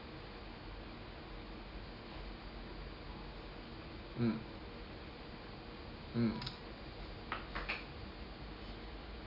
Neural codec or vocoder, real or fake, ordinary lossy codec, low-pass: none; real; MP3, 48 kbps; 5.4 kHz